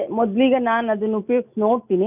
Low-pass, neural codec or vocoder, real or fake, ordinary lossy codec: 3.6 kHz; none; real; none